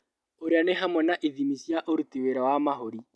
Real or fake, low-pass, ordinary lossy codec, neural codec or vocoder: real; none; none; none